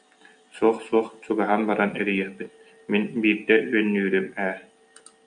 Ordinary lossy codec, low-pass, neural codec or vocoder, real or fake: MP3, 96 kbps; 9.9 kHz; none; real